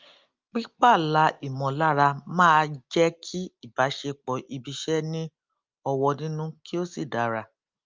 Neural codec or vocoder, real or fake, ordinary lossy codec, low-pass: none; real; Opus, 24 kbps; 7.2 kHz